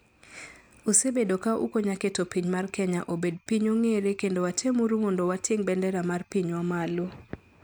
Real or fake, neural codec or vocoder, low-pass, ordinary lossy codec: real; none; 19.8 kHz; none